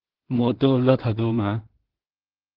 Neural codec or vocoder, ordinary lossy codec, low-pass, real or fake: codec, 16 kHz in and 24 kHz out, 0.4 kbps, LongCat-Audio-Codec, two codebook decoder; Opus, 16 kbps; 5.4 kHz; fake